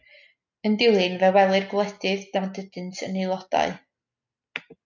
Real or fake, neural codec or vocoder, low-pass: real; none; 7.2 kHz